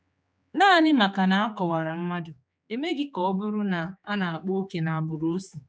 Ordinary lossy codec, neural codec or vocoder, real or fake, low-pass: none; codec, 16 kHz, 4 kbps, X-Codec, HuBERT features, trained on general audio; fake; none